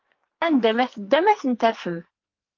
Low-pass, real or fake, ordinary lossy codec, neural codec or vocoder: 7.2 kHz; fake; Opus, 32 kbps; codec, 44.1 kHz, 2.6 kbps, SNAC